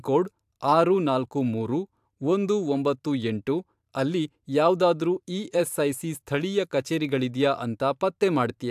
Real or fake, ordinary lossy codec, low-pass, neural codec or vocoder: fake; AAC, 96 kbps; 14.4 kHz; vocoder, 44.1 kHz, 128 mel bands every 512 samples, BigVGAN v2